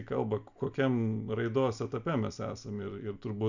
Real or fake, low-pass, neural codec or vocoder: real; 7.2 kHz; none